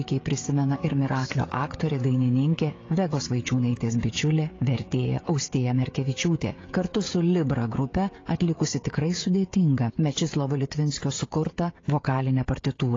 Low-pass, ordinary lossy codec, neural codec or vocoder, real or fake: 7.2 kHz; AAC, 32 kbps; codec, 16 kHz, 16 kbps, FreqCodec, smaller model; fake